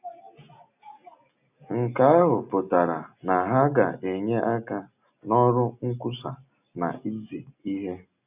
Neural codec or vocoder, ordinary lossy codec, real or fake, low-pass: none; none; real; 3.6 kHz